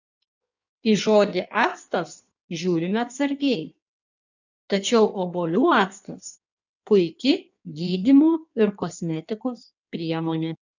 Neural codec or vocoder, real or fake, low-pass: codec, 16 kHz in and 24 kHz out, 1.1 kbps, FireRedTTS-2 codec; fake; 7.2 kHz